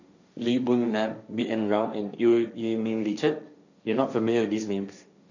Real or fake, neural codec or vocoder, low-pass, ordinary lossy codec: fake; codec, 16 kHz, 1.1 kbps, Voila-Tokenizer; 7.2 kHz; none